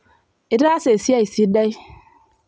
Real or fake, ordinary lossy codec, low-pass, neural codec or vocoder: real; none; none; none